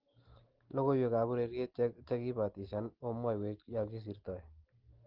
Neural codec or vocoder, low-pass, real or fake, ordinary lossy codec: none; 5.4 kHz; real; Opus, 16 kbps